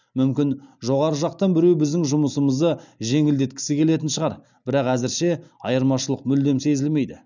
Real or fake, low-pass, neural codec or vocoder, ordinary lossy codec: real; 7.2 kHz; none; none